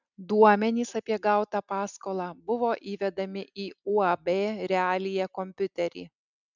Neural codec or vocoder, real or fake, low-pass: none; real; 7.2 kHz